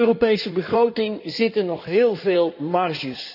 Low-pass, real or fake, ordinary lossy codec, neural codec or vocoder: 5.4 kHz; fake; none; codec, 16 kHz in and 24 kHz out, 2.2 kbps, FireRedTTS-2 codec